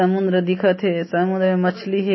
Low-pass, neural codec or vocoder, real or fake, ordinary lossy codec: 7.2 kHz; none; real; MP3, 24 kbps